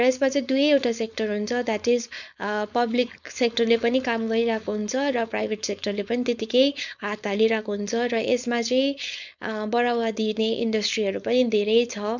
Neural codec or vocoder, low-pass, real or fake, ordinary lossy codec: codec, 16 kHz, 4.8 kbps, FACodec; 7.2 kHz; fake; none